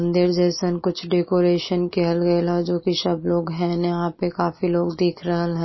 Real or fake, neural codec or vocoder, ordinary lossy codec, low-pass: real; none; MP3, 24 kbps; 7.2 kHz